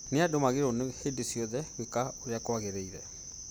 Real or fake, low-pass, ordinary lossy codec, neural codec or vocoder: real; none; none; none